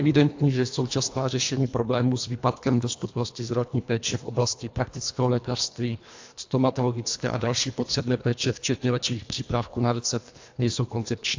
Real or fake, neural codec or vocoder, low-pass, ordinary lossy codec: fake; codec, 24 kHz, 1.5 kbps, HILCodec; 7.2 kHz; AAC, 48 kbps